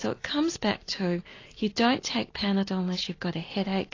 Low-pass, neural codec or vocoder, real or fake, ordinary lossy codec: 7.2 kHz; none; real; AAC, 32 kbps